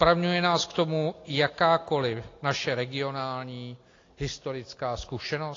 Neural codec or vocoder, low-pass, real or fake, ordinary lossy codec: none; 7.2 kHz; real; AAC, 32 kbps